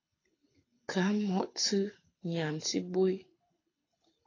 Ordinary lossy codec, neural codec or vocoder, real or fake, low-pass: AAC, 32 kbps; codec, 24 kHz, 6 kbps, HILCodec; fake; 7.2 kHz